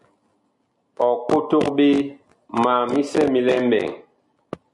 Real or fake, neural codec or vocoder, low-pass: real; none; 10.8 kHz